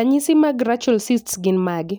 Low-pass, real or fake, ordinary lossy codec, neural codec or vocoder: none; real; none; none